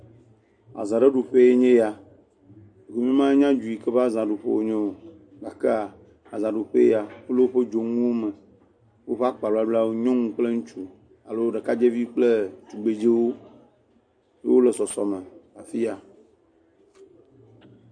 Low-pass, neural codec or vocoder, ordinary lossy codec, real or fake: 9.9 kHz; none; MP3, 48 kbps; real